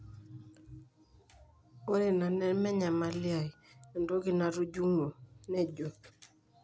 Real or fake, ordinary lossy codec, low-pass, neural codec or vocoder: real; none; none; none